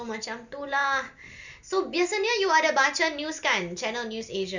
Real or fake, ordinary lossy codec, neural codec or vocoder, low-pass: real; none; none; 7.2 kHz